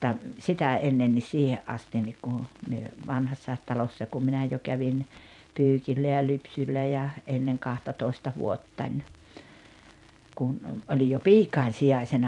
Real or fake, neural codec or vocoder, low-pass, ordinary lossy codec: fake; vocoder, 44.1 kHz, 128 mel bands every 512 samples, BigVGAN v2; 10.8 kHz; none